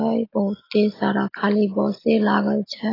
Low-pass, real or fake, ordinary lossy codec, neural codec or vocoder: 5.4 kHz; real; AAC, 24 kbps; none